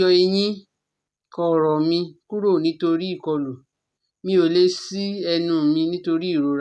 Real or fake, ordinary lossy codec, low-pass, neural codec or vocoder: real; none; none; none